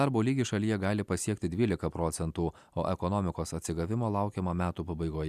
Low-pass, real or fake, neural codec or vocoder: 14.4 kHz; real; none